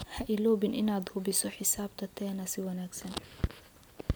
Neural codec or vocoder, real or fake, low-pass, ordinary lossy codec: none; real; none; none